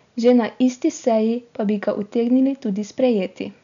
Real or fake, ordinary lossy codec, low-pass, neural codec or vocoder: real; none; 7.2 kHz; none